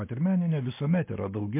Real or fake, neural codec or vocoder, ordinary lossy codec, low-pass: real; none; MP3, 24 kbps; 3.6 kHz